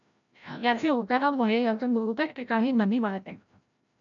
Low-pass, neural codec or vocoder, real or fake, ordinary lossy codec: 7.2 kHz; codec, 16 kHz, 0.5 kbps, FreqCodec, larger model; fake; MP3, 96 kbps